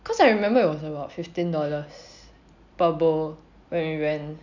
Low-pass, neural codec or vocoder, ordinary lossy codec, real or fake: 7.2 kHz; none; none; real